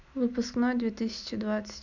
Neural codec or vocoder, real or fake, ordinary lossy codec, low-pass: none; real; none; 7.2 kHz